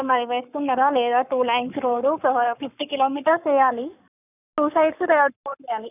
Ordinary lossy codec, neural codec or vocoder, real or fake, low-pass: none; codec, 44.1 kHz, 7.8 kbps, Pupu-Codec; fake; 3.6 kHz